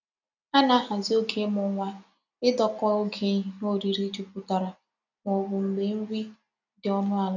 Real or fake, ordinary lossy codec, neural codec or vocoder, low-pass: real; none; none; 7.2 kHz